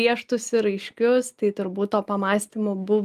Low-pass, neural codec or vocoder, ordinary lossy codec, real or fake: 14.4 kHz; none; Opus, 32 kbps; real